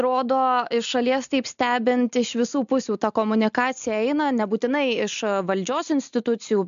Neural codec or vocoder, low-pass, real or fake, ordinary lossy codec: none; 7.2 kHz; real; MP3, 64 kbps